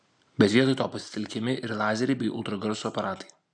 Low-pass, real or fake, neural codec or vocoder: 9.9 kHz; real; none